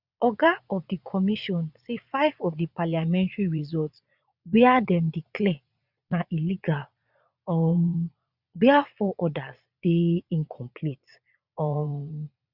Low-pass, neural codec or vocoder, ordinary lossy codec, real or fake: 5.4 kHz; vocoder, 22.05 kHz, 80 mel bands, Vocos; none; fake